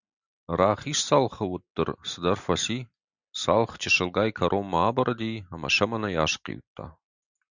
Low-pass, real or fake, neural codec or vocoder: 7.2 kHz; real; none